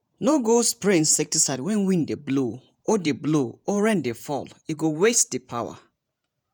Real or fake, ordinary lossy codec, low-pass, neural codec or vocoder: fake; none; none; vocoder, 48 kHz, 128 mel bands, Vocos